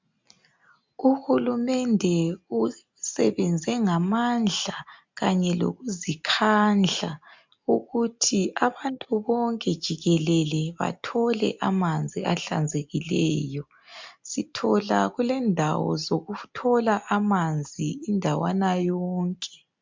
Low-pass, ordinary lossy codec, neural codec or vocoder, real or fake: 7.2 kHz; MP3, 64 kbps; none; real